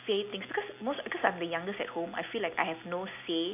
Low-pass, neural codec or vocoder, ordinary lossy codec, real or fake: 3.6 kHz; none; AAC, 32 kbps; real